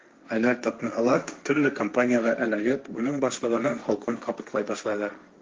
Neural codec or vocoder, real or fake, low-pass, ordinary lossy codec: codec, 16 kHz, 1.1 kbps, Voila-Tokenizer; fake; 7.2 kHz; Opus, 24 kbps